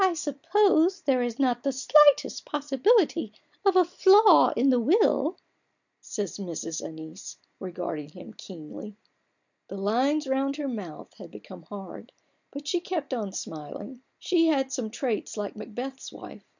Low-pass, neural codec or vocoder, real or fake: 7.2 kHz; none; real